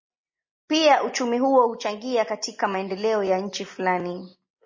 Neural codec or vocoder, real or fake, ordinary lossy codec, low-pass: none; real; MP3, 32 kbps; 7.2 kHz